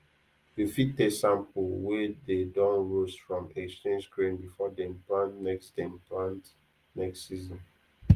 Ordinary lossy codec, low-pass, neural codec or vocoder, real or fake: Opus, 24 kbps; 14.4 kHz; none; real